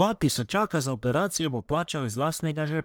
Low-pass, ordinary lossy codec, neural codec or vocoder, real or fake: none; none; codec, 44.1 kHz, 1.7 kbps, Pupu-Codec; fake